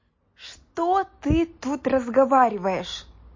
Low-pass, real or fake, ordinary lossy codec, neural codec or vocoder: 7.2 kHz; real; MP3, 32 kbps; none